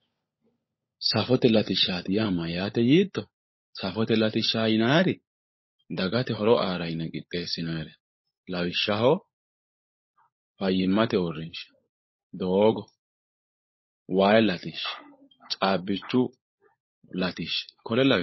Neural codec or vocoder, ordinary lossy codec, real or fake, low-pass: codec, 16 kHz, 16 kbps, FunCodec, trained on LibriTTS, 50 frames a second; MP3, 24 kbps; fake; 7.2 kHz